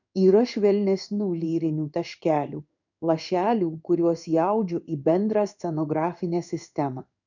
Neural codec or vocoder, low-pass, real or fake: codec, 16 kHz in and 24 kHz out, 1 kbps, XY-Tokenizer; 7.2 kHz; fake